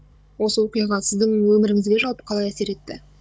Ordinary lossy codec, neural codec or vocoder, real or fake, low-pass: none; codec, 16 kHz, 16 kbps, FunCodec, trained on Chinese and English, 50 frames a second; fake; none